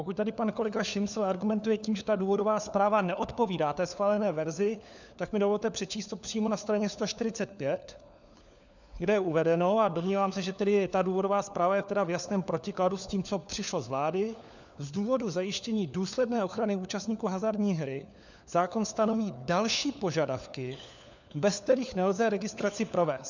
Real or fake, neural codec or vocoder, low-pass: fake; codec, 16 kHz, 4 kbps, FunCodec, trained on LibriTTS, 50 frames a second; 7.2 kHz